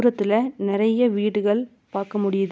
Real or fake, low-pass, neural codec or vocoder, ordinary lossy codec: real; none; none; none